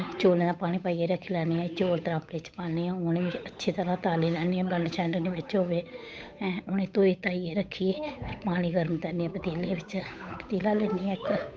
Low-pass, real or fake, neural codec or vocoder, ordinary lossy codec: none; fake; codec, 16 kHz, 8 kbps, FunCodec, trained on Chinese and English, 25 frames a second; none